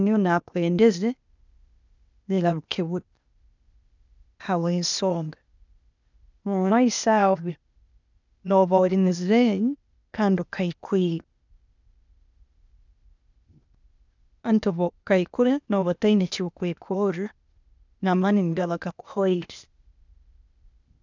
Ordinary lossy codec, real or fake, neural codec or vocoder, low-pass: none; fake; codec, 16 kHz, 0.8 kbps, ZipCodec; 7.2 kHz